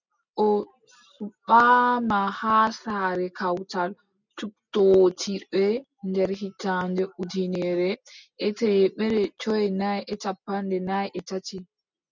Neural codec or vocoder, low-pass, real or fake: none; 7.2 kHz; real